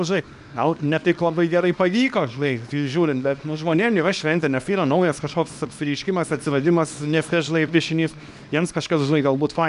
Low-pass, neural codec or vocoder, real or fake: 10.8 kHz; codec, 24 kHz, 0.9 kbps, WavTokenizer, small release; fake